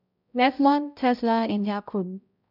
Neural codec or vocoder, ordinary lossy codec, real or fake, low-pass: codec, 16 kHz, 0.5 kbps, X-Codec, HuBERT features, trained on balanced general audio; none; fake; 5.4 kHz